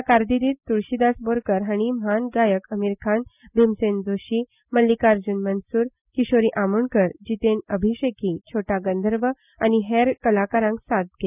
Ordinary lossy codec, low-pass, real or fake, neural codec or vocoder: none; 3.6 kHz; real; none